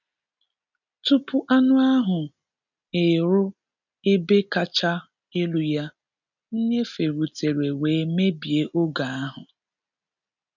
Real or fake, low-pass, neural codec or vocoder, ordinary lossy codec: real; 7.2 kHz; none; none